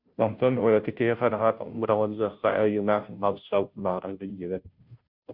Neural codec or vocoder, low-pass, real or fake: codec, 16 kHz, 0.5 kbps, FunCodec, trained on Chinese and English, 25 frames a second; 5.4 kHz; fake